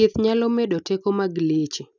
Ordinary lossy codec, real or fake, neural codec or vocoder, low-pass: none; real; none; 7.2 kHz